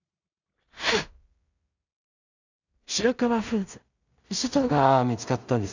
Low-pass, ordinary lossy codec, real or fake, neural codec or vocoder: 7.2 kHz; none; fake; codec, 16 kHz in and 24 kHz out, 0.4 kbps, LongCat-Audio-Codec, two codebook decoder